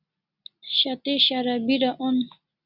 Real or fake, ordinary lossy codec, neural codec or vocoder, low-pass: real; Opus, 64 kbps; none; 5.4 kHz